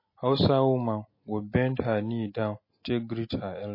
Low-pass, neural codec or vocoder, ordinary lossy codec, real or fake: 5.4 kHz; none; MP3, 24 kbps; real